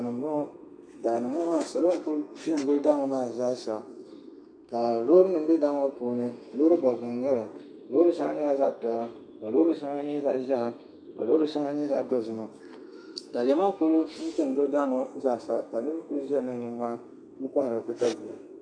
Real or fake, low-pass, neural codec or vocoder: fake; 9.9 kHz; codec, 32 kHz, 1.9 kbps, SNAC